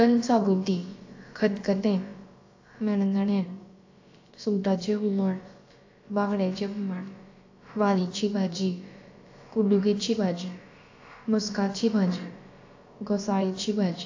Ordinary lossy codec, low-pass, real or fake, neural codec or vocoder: AAC, 48 kbps; 7.2 kHz; fake; codec, 16 kHz, about 1 kbps, DyCAST, with the encoder's durations